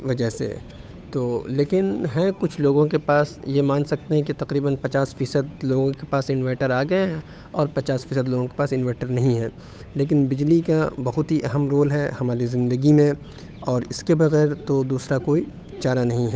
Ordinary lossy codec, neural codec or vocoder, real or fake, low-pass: none; codec, 16 kHz, 8 kbps, FunCodec, trained on Chinese and English, 25 frames a second; fake; none